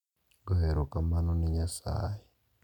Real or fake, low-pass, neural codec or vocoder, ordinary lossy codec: real; 19.8 kHz; none; none